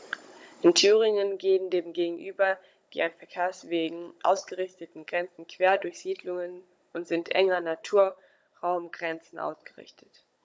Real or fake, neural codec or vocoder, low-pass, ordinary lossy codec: fake; codec, 16 kHz, 16 kbps, FunCodec, trained on Chinese and English, 50 frames a second; none; none